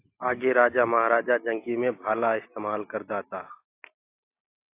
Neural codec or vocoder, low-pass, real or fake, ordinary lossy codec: none; 3.6 kHz; real; AAC, 24 kbps